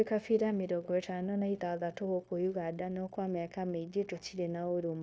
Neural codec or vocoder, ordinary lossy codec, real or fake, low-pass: codec, 16 kHz, 0.9 kbps, LongCat-Audio-Codec; none; fake; none